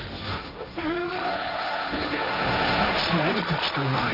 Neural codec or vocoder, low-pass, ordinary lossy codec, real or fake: codec, 16 kHz, 1.1 kbps, Voila-Tokenizer; 5.4 kHz; none; fake